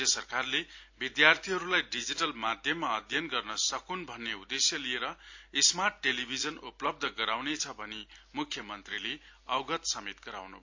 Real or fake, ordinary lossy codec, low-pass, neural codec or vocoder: real; AAC, 48 kbps; 7.2 kHz; none